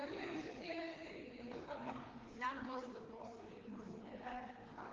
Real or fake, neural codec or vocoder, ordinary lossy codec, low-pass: fake; codec, 16 kHz, 2 kbps, FreqCodec, larger model; Opus, 16 kbps; 7.2 kHz